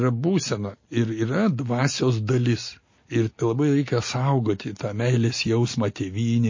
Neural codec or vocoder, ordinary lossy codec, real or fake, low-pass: none; MP3, 32 kbps; real; 7.2 kHz